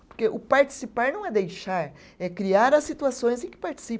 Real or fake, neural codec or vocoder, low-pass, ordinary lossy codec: real; none; none; none